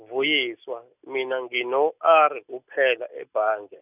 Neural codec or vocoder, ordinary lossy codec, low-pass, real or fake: none; none; 3.6 kHz; real